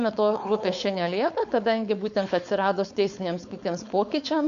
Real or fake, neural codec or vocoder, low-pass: fake; codec, 16 kHz, 4.8 kbps, FACodec; 7.2 kHz